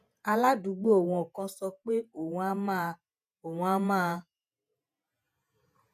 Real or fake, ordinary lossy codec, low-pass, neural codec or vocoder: fake; none; 14.4 kHz; vocoder, 48 kHz, 128 mel bands, Vocos